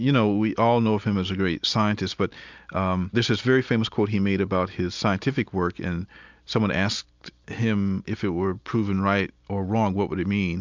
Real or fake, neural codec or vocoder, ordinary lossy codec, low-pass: real; none; MP3, 64 kbps; 7.2 kHz